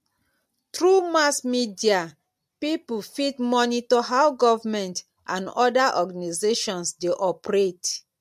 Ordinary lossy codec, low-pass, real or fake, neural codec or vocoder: MP3, 64 kbps; 14.4 kHz; real; none